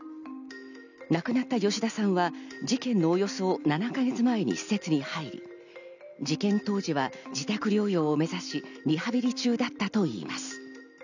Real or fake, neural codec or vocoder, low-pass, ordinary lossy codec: real; none; 7.2 kHz; none